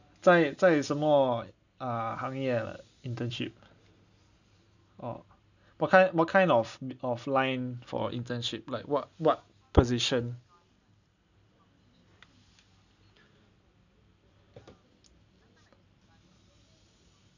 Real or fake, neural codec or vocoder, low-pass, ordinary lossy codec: real; none; 7.2 kHz; none